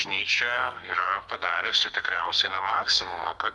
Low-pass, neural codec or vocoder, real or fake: 10.8 kHz; codec, 32 kHz, 1.9 kbps, SNAC; fake